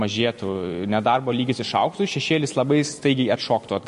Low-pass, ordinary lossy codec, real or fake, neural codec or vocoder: 10.8 kHz; MP3, 48 kbps; real; none